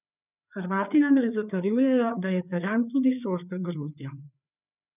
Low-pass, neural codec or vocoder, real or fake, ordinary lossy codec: 3.6 kHz; codec, 16 kHz, 4 kbps, FreqCodec, larger model; fake; none